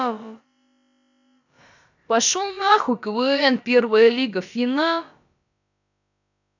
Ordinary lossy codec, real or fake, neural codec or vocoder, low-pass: none; fake; codec, 16 kHz, about 1 kbps, DyCAST, with the encoder's durations; 7.2 kHz